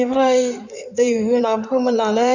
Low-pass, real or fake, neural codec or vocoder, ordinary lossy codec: 7.2 kHz; fake; codec, 16 kHz in and 24 kHz out, 2.2 kbps, FireRedTTS-2 codec; none